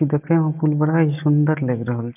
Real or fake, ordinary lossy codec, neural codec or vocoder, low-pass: real; none; none; 3.6 kHz